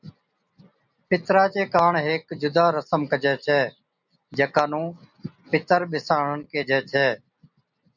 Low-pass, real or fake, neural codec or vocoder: 7.2 kHz; real; none